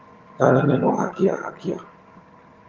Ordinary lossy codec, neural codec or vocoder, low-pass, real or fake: Opus, 32 kbps; vocoder, 22.05 kHz, 80 mel bands, HiFi-GAN; 7.2 kHz; fake